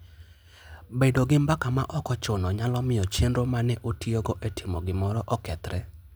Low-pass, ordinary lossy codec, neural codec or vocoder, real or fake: none; none; none; real